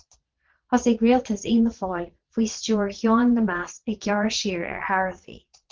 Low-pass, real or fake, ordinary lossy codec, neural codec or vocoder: 7.2 kHz; fake; Opus, 16 kbps; vocoder, 22.05 kHz, 80 mel bands, Vocos